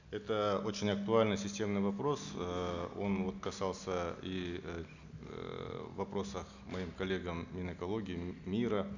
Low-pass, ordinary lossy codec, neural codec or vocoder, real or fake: 7.2 kHz; none; none; real